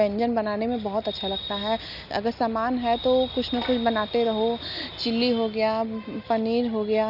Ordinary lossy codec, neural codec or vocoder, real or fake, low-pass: none; none; real; 5.4 kHz